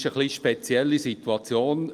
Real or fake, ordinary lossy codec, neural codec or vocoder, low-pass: real; Opus, 32 kbps; none; 14.4 kHz